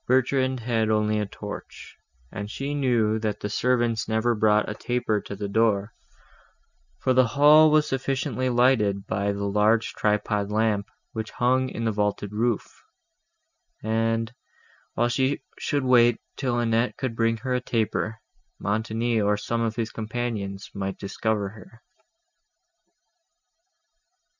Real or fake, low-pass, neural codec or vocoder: real; 7.2 kHz; none